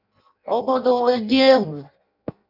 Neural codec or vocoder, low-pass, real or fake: codec, 16 kHz in and 24 kHz out, 0.6 kbps, FireRedTTS-2 codec; 5.4 kHz; fake